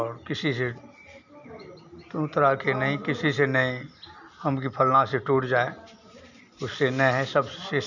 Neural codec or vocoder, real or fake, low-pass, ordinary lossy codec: none; real; 7.2 kHz; none